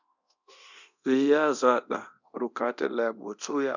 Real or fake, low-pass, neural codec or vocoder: fake; 7.2 kHz; codec, 24 kHz, 0.9 kbps, DualCodec